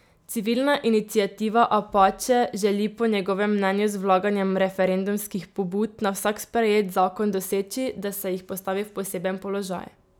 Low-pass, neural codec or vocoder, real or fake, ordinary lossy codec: none; none; real; none